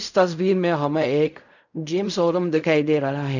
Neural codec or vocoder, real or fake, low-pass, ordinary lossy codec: codec, 16 kHz in and 24 kHz out, 0.4 kbps, LongCat-Audio-Codec, fine tuned four codebook decoder; fake; 7.2 kHz; none